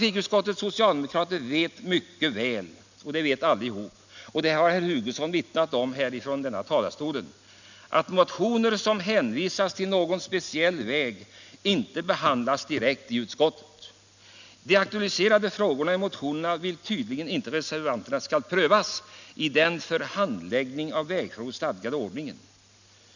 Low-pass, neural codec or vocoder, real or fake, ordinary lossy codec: 7.2 kHz; none; real; none